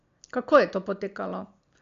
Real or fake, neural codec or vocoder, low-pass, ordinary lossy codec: real; none; 7.2 kHz; AAC, 48 kbps